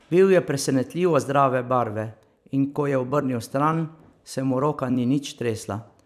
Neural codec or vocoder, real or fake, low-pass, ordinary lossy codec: vocoder, 44.1 kHz, 128 mel bands every 256 samples, BigVGAN v2; fake; 14.4 kHz; none